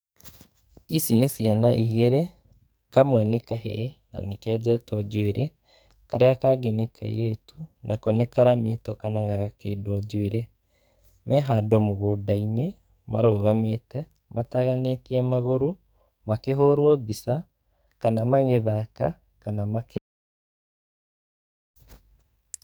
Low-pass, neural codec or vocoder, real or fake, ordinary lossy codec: none; codec, 44.1 kHz, 2.6 kbps, SNAC; fake; none